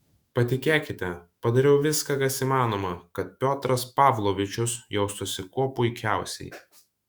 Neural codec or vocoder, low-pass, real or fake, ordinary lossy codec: autoencoder, 48 kHz, 128 numbers a frame, DAC-VAE, trained on Japanese speech; 19.8 kHz; fake; Opus, 64 kbps